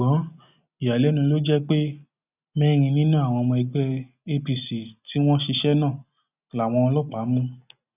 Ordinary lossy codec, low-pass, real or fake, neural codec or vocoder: none; 3.6 kHz; real; none